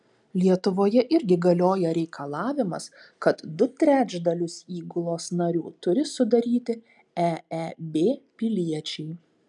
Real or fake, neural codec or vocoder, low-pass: real; none; 10.8 kHz